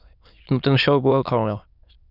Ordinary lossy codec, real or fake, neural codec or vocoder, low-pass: Opus, 64 kbps; fake; autoencoder, 22.05 kHz, a latent of 192 numbers a frame, VITS, trained on many speakers; 5.4 kHz